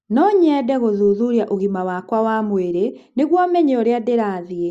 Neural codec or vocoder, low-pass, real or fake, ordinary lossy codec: none; 14.4 kHz; real; Opus, 64 kbps